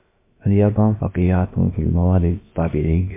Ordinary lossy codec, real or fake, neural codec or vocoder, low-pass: MP3, 24 kbps; fake; codec, 16 kHz, about 1 kbps, DyCAST, with the encoder's durations; 3.6 kHz